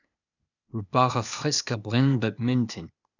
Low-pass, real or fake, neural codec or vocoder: 7.2 kHz; fake; codec, 16 kHz, 0.8 kbps, ZipCodec